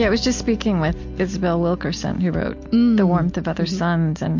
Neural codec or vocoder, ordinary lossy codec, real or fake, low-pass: none; MP3, 48 kbps; real; 7.2 kHz